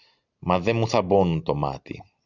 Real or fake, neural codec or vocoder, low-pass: real; none; 7.2 kHz